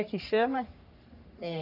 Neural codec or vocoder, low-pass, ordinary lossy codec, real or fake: codec, 44.1 kHz, 3.4 kbps, Pupu-Codec; 5.4 kHz; none; fake